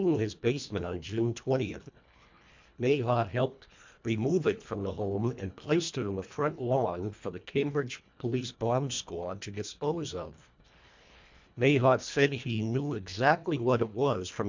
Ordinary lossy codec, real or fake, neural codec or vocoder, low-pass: MP3, 64 kbps; fake; codec, 24 kHz, 1.5 kbps, HILCodec; 7.2 kHz